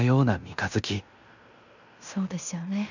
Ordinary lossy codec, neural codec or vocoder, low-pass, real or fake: none; codec, 16 kHz in and 24 kHz out, 0.9 kbps, LongCat-Audio-Codec, fine tuned four codebook decoder; 7.2 kHz; fake